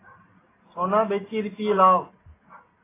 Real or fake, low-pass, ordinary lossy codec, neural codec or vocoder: real; 3.6 kHz; AAC, 16 kbps; none